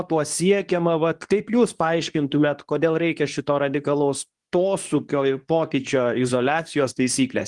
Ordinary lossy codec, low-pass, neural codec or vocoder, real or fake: Opus, 24 kbps; 10.8 kHz; codec, 24 kHz, 0.9 kbps, WavTokenizer, medium speech release version 2; fake